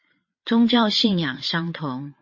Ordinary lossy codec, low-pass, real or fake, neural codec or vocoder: MP3, 32 kbps; 7.2 kHz; fake; vocoder, 22.05 kHz, 80 mel bands, Vocos